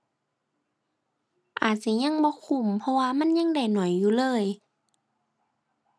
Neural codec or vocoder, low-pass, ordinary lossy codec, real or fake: none; none; none; real